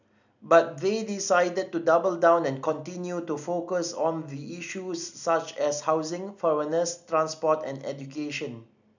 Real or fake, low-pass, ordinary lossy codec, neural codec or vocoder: real; 7.2 kHz; none; none